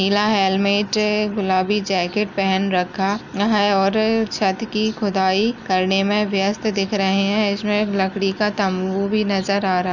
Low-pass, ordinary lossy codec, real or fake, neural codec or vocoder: 7.2 kHz; none; real; none